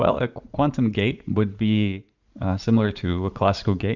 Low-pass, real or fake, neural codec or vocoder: 7.2 kHz; fake; vocoder, 44.1 kHz, 80 mel bands, Vocos